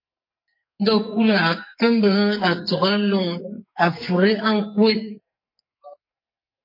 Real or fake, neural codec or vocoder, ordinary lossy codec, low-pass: fake; codec, 44.1 kHz, 2.6 kbps, SNAC; MP3, 32 kbps; 5.4 kHz